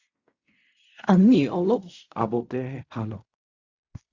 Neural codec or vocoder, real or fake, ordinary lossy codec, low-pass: codec, 16 kHz in and 24 kHz out, 0.4 kbps, LongCat-Audio-Codec, fine tuned four codebook decoder; fake; Opus, 64 kbps; 7.2 kHz